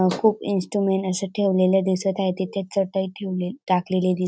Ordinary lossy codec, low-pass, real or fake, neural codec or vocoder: none; none; real; none